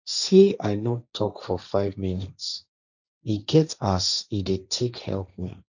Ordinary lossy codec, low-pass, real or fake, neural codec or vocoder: none; 7.2 kHz; fake; codec, 16 kHz, 1.1 kbps, Voila-Tokenizer